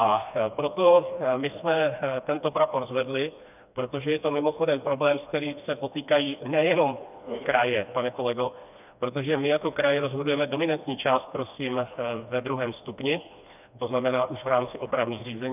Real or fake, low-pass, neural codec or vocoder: fake; 3.6 kHz; codec, 16 kHz, 2 kbps, FreqCodec, smaller model